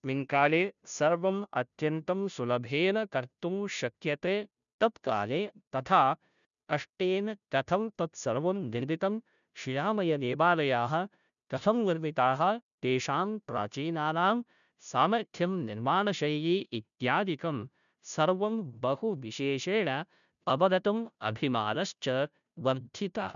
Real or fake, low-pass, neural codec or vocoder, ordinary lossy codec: fake; 7.2 kHz; codec, 16 kHz, 0.5 kbps, FunCodec, trained on Chinese and English, 25 frames a second; none